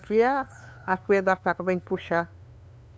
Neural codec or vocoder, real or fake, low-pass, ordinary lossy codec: codec, 16 kHz, 2 kbps, FunCodec, trained on LibriTTS, 25 frames a second; fake; none; none